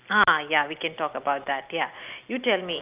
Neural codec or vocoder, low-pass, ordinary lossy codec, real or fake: none; 3.6 kHz; Opus, 32 kbps; real